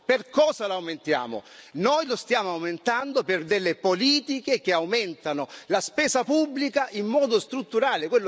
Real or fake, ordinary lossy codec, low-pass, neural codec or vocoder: real; none; none; none